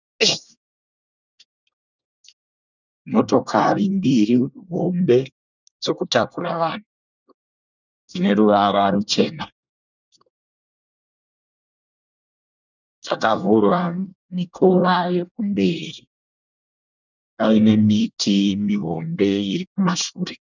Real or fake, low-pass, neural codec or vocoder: fake; 7.2 kHz; codec, 24 kHz, 1 kbps, SNAC